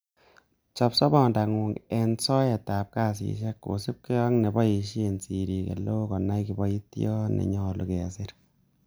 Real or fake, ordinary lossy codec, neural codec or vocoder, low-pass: real; none; none; none